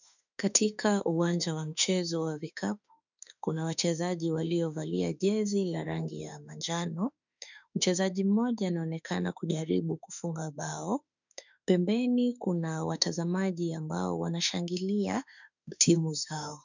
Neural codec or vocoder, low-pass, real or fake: autoencoder, 48 kHz, 32 numbers a frame, DAC-VAE, trained on Japanese speech; 7.2 kHz; fake